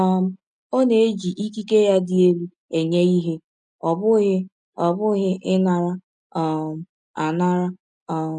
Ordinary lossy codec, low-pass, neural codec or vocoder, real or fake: none; 9.9 kHz; none; real